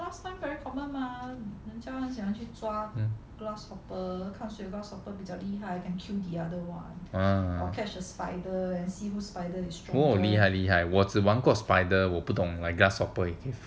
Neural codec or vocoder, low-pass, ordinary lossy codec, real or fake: none; none; none; real